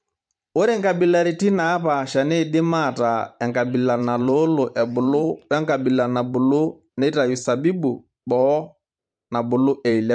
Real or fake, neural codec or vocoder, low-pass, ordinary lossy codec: fake; vocoder, 44.1 kHz, 128 mel bands every 512 samples, BigVGAN v2; 9.9 kHz; MP3, 64 kbps